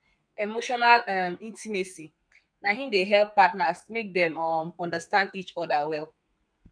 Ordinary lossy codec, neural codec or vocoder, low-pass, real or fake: none; codec, 32 kHz, 1.9 kbps, SNAC; 9.9 kHz; fake